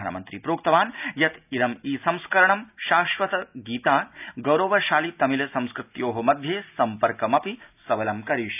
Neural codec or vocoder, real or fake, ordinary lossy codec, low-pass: none; real; none; 3.6 kHz